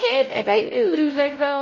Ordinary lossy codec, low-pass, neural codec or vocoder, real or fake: MP3, 32 kbps; 7.2 kHz; codec, 16 kHz, 0.5 kbps, X-Codec, WavLM features, trained on Multilingual LibriSpeech; fake